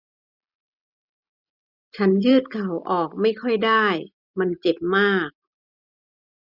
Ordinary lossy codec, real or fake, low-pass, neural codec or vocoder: none; real; 5.4 kHz; none